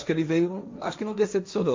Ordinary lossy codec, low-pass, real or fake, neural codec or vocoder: MP3, 48 kbps; 7.2 kHz; fake; codec, 16 kHz, 1.1 kbps, Voila-Tokenizer